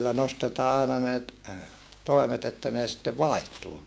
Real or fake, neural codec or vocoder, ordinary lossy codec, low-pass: fake; codec, 16 kHz, 6 kbps, DAC; none; none